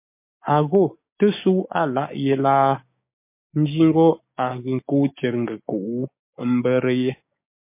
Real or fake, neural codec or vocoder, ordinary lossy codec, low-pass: fake; codec, 16 kHz, 6 kbps, DAC; MP3, 24 kbps; 3.6 kHz